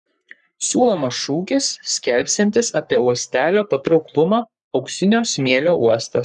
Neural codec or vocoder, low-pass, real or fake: codec, 44.1 kHz, 3.4 kbps, Pupu-Codec; 10.8 kHz; fake